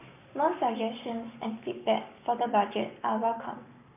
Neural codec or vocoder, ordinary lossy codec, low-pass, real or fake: codec, 44.1 kHz, 7.8 kbps, Pupu-Codec; none; 3.6 kHz; fake